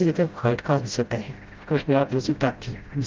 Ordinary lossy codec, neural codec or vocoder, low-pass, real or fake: Opus, 32 kbps; codec, 16 kHz, 0.5 kbps, FreqCodec, smaller model; 7.2 kHz; fake